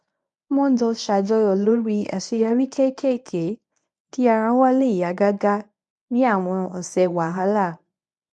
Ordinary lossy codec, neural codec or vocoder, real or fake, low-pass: none; codec, 24 kHz, 0.9 kbps, WavTokenizer, medium speech release version 1; fake; none